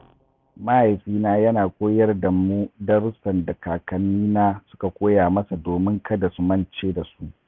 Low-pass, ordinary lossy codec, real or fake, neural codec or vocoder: none; none; real; none